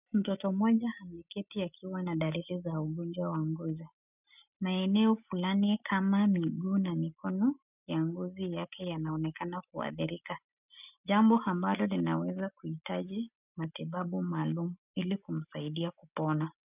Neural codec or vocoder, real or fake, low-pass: none; real; 3.6 kHz